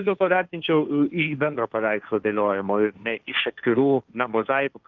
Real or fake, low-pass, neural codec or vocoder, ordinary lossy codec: fake; 7.2 kHz; codec, 16 kHz, 1.1 kbps, Voila-Tokenizer; Opus, 24 kbps